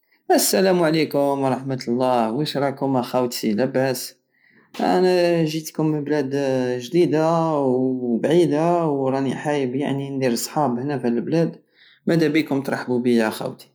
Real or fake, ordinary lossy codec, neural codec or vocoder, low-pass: real; none; none; none